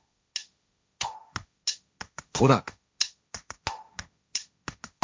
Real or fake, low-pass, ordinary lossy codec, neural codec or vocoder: fake; none; none; codec, 16 kHz, 1.1 kbps, Voila-Tokenizer